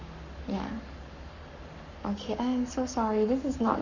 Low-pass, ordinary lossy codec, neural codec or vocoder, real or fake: 7.2 kHz; none; codec, 44.1 kHz, 7.8 kbps, Pupu-Codec; fake